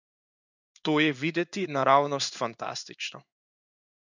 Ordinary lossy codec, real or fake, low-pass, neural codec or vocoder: none; fake; 7.2 kHz; codec, 16 kHz in and 24 kHz out, 1 kbps, XY-Tokenizer